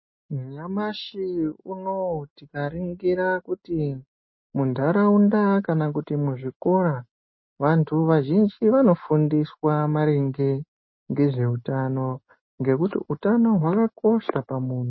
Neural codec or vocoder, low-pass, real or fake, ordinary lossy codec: none; 7.2 kHz; real; MP3, 24 kbps